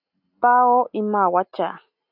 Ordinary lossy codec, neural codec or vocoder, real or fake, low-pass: AAC, 48 kbps; none; real; 5.4 kHz